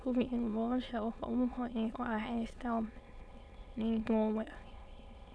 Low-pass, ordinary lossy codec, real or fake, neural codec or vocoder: none; none; fake; autoencoder, 22.05 kHz, a latent of 192 numbers a frame, VITS, trained on many speakers